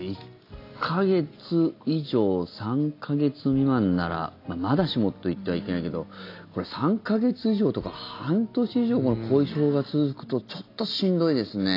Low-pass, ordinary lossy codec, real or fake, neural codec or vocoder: 5.4 kHz; AAC, 32 kbps; real; none